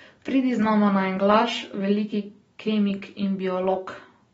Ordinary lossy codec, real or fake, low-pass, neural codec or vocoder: AAC, 24 kbps; real; 19.8 kHz; none